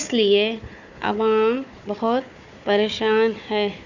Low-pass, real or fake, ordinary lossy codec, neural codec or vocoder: 7.2 kHz; fake; none; codec, 16 kHz, 4 kbps, FunCodec, trained on Chinese and English, 50 frames a second